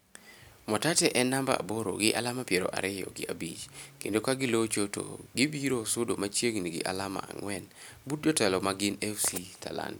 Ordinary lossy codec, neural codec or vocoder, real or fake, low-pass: none; none; real; none